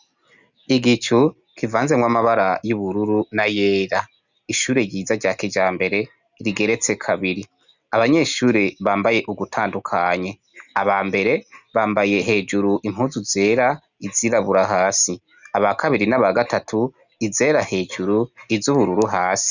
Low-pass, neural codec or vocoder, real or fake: 7.2 kHz; none; real